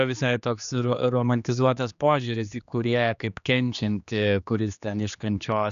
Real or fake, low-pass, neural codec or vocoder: fake; 7.2 kHz; codec, 16 kHz, 2 kbps, X-Codec, HuBERT features, trained on general audio